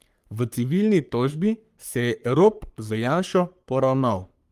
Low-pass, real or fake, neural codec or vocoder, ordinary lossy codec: 14.4 kHz; fake; codec, 44.1 kHz, 3.4 kbps, Pupu-Codec; Opus, 32 kbps